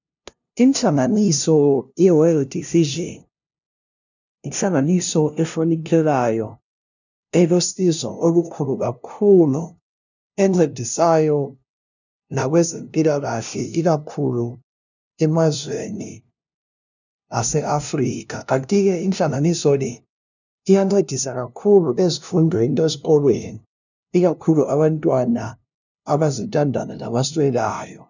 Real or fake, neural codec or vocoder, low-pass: fake; codec, 16 kHz, 0.5 kbps, FunCodec, trained on LibriTTS, 25 frames a second; 7.2 kHz